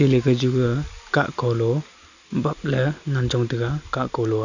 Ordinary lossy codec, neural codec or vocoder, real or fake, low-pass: none; none; real; 7.2 kHz